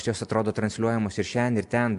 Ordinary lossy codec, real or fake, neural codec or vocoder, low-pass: MP3, 64 kbps; real; none; 10.8 kHz